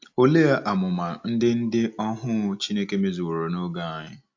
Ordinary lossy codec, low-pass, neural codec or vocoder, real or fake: AAC, 48 kbps; 7.2 kHz; none; real